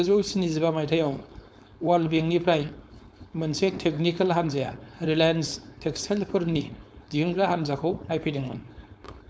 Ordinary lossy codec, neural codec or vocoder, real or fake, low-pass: none; codec, 16 kHz, 4.8 kbps, FACodec; fake; none